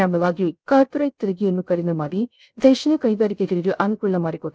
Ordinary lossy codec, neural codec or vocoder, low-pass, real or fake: none; codec, 16 kHz, 0.3 kbps, FocalCodec; none; fake